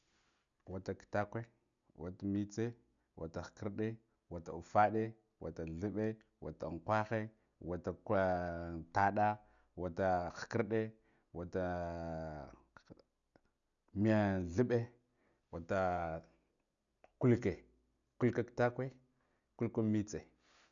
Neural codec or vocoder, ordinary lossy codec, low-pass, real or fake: none; none; 7.2 kHz; real